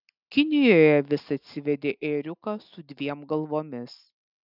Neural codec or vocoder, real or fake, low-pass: none; real; 5.4 kHz